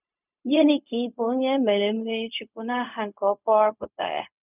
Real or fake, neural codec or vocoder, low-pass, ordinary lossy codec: fake; codec, 16 kHz, 0.4 kbps, LongCat-Audio-Codec; 3.6 kHz; none